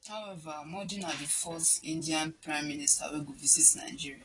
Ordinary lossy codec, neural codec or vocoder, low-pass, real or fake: AAC, 32 kbps; vocoder, 44.1 kHz, 128 mel bands every 256 samples, BigVGAN v2; 10.8 kHz; fake